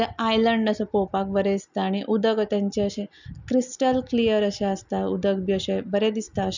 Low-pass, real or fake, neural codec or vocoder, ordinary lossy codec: 7.2 kHz; real; none; none